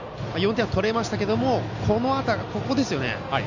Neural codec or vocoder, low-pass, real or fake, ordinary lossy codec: none; 7.2 kHz; real; none